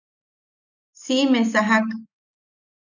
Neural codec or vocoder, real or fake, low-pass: none; real; 7.2 kHz